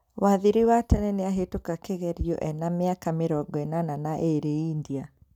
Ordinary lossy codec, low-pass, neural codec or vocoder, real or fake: none; 19.8 kHz; none; real